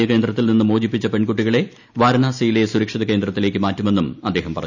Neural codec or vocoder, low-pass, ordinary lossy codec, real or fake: none; 7.2 kHz; none; real